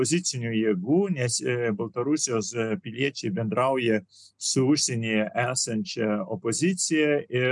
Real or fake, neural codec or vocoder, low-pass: real; none; 10.8 kHz